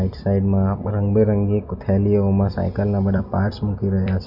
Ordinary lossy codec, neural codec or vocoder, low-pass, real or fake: none; vocoder, 44.1 kHz, 128 mel bands every 256 samples, BigVGAN v2; 5.4 kHz; fake